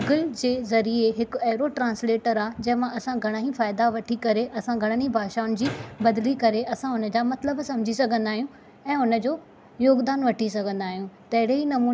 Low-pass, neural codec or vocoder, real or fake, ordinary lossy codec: none; none; real; none